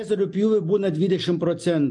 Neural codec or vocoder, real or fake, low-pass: none; real; 10.8 kHz